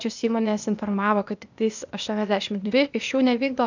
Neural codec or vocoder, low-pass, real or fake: codec, 16 kHz, 0.8 kbps, ZipCodec; 7.2 kHz; fake